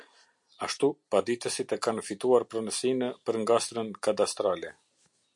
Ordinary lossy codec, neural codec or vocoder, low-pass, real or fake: MP3, 64 kbps; none; 10.8 kHz; real